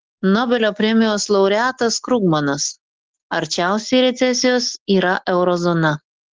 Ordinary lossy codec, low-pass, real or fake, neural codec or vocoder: Opus, 16 kbps; 7.2 kHz; real; none